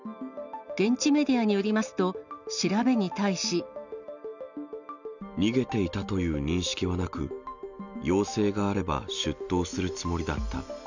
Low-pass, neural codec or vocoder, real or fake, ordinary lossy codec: 7.2 kHz; none; real; none